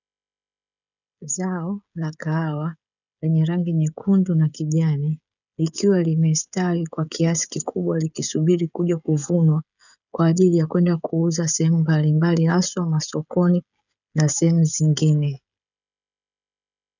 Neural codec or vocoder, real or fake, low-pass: codec, 16 kHz, 16 kbps, FreqCodec, smaller model; fake; 7.2 kHz